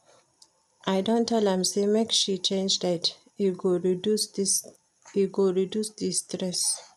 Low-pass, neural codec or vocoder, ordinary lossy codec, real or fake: 14.4 kHz; none; none; real